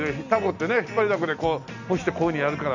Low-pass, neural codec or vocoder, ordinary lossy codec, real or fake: 7.2 kHz; none; none; real